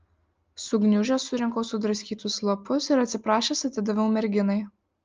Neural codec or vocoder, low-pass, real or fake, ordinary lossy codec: none; 7.2 kHz; real; Opus, 24 kbps